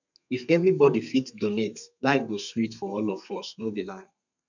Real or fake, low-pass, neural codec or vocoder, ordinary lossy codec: fake; 7.2 kHz; codec, 32 kHz, 1.9 kbps, SNAC; none